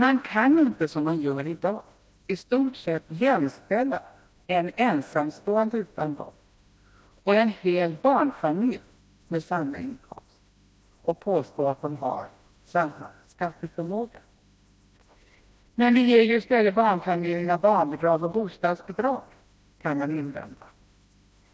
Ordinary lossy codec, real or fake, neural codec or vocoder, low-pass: none; fake; codec, 16 kHz, 1 kbps, FreqCodec, smaller model; none